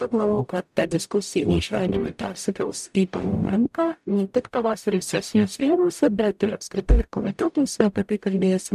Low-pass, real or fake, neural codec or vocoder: 14.4 kHz; fake; codec, 44.1 kHz, 0.9 kbps, DAC